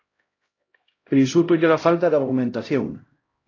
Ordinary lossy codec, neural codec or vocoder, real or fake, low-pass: AAC, 32 kbps; codec, 16 kHz, 0.5 kbps, X-Codec, HuBERT features, trained on LibriSpeech; fake; 7.2 kHz